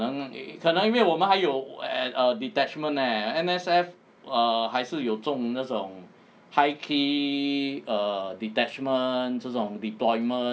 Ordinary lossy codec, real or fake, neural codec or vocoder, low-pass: none; real; none; none